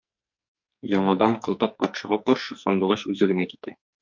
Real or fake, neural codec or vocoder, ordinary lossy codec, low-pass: fake; codec, 44.1 kHz, 2.6 kbps, SNAC; MP3, 48 kbps; 7.2 kHz